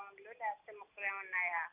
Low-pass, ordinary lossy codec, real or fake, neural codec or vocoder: 3.6 kHz; none; real; none